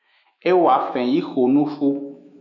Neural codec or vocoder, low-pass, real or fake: autoencoder, 48 kHz, 128 numbers a frame, DAC-VAE, trained on Japanese speech; 7.2 kHz; fake